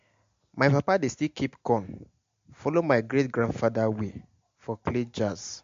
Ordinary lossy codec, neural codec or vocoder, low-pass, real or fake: MP3, 48 kbps; none; 7.2 kHz; real